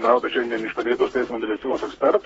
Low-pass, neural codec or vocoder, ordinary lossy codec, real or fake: 14.4 kHz; codec, 32 kHz, 1.9 kbps, SNAC; AAC, 24 kbps; fake